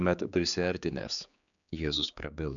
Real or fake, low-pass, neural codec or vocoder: fake; 7.2 kHz; codec, 16 kHz, 4 kbps, X-Codec, HuBERT features, trained on general audio